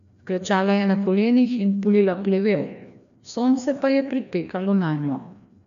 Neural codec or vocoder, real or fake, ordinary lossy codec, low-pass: codec, 16 kHz, 1 kbps, FreqCodec, larger model; fake; none; 7.2 kHz